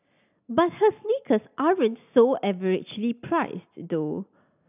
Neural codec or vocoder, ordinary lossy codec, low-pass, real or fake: none; none; 3.6 kHz; real